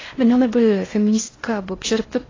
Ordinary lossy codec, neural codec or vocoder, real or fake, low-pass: AAC, 32 kbps; codec, 16 kHz in and 24 kHz out, 0.6 kbps, FocalCodec, streaming, 4096 codes; fake; 7.2 kHz